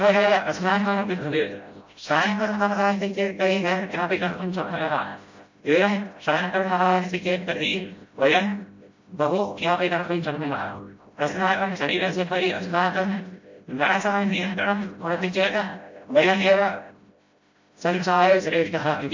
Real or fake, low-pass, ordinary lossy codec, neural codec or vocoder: fake; 7.2 kHz; MP3, 48 kbps; codec, 16 kHz, 0.5 kbps, FreqCodec, smaller model